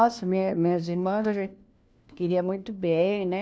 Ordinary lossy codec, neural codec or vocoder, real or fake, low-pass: none; codec, 16 kHz, 1 kbps, FunCodec, trained on LibriTTS, 50 frames a second; fake; none